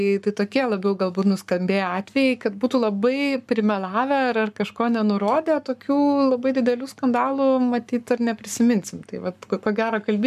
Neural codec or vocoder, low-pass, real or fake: codec, 44.1 kHz, 7.8 kbps, Pupu-Codec; 14.4 kHz; fake